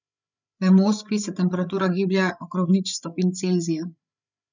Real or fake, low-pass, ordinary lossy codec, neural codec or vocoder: fake; 7.2 kHz; none; codec, 16 kHz, 8 kbps, FreqCodec, larger model